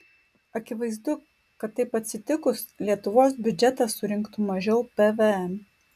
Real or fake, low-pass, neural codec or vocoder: real; 14.4 kHz; none